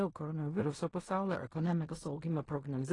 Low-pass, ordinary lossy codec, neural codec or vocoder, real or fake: 10.8 kHz; AAC, 32 kbps; codec, 16 kHz in and 24 kHz out, 0.4 kbps, LongCat-Audio-Codec, fine tuned four codebook decoder; fake